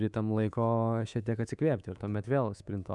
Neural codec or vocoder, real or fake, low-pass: codec, 24 kHz, 3.1 kbps, DualCodec; fake; 10.8 kHz